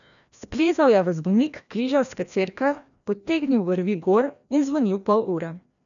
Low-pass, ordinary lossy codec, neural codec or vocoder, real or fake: 7.2 kHz; none; codec, 16 kHz, 1 kbps, FreqCodec, larger model; fake